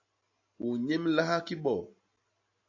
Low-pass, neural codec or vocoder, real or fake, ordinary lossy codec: 7.2 kHz; none; real; AAC, 48 kbps